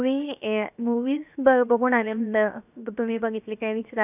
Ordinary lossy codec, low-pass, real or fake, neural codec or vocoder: none; 3.6 kHz; fake; codec, 16 kHz, 0.7 kbps, FocalCodec